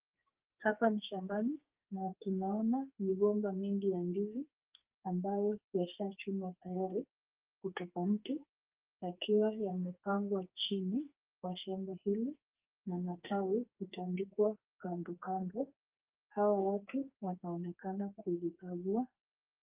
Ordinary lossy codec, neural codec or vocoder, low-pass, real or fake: Opus, 32 kbps; codec, 44.1 kHz, 2.6 kbps, SNAC; 3.6 kHz; fake